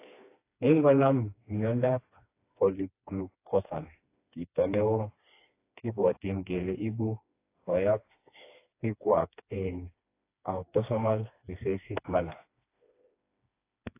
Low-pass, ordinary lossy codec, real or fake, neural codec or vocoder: 3.6 kHz; AAC, 24 kbps; fake; codec, 16 kHz, 2 kbps, FreqCodec, smaller model